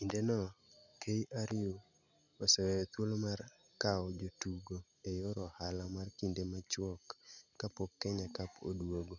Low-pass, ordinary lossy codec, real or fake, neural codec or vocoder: 7.2 kHz; none; real; none